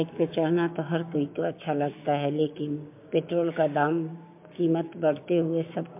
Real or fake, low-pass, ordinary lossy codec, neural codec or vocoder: fake; 3.6 kHz; MP3, 32 kbps; codec, 16 kHz, 6 kbps, DAC